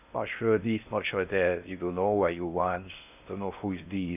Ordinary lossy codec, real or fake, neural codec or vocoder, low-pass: none; fake; codec, 16 kHz in and 24 kHz out, 0.6 kbps, FocalCodec, streaming, 2048 codes; 3.6 kHz